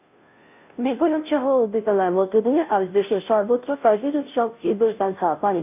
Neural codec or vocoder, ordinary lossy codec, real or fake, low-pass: codec, 16 kHz, 0.5 kbps, FunCodec, trained on Chinese and English, 25 frames a second; none; fake; 3.6 kHz